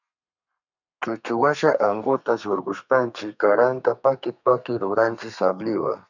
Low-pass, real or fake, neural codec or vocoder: 7.2 kHz; fake; codec, 32 kHz, 1.9 kbps, SNAC